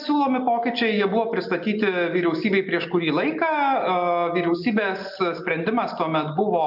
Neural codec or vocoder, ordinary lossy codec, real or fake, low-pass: none; AAC, 48 kbps; real; 5.4 kHz